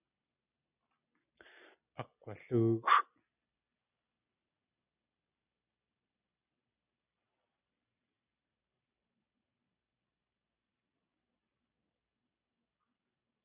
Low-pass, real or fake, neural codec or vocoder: 3.6 kHz; real; none